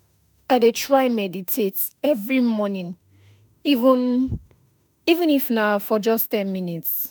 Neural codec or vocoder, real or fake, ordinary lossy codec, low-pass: autoencoder, 48 kHz, 32 numbers a frame, DAC-VAE, trained on Japanese speech; fake; none; none